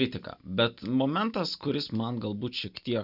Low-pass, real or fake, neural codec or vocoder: 5.4 kHz; real; none